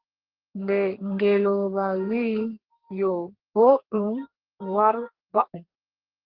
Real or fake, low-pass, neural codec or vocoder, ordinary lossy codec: fake; 5.4 kHz; codec, 32 kHz, 1.9 kbps, SNAC; Opus, 16 kbps